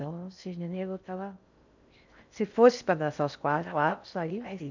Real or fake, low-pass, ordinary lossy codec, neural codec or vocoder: fake; 7.2 kHz; none; codec, 16 kHz in and 24 kHz out, 0.6 kbps, FocalCodec, streaming, 4096 codes